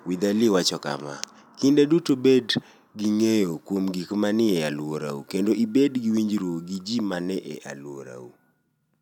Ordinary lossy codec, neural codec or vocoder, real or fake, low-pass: none; none; real; 19.8 kHz